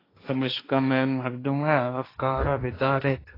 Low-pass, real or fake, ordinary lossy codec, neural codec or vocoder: 5.4 kHz; fake; AAC, 24 kbps; codec, 16 kHz, 1.1 kbps, Voila-Tokenizer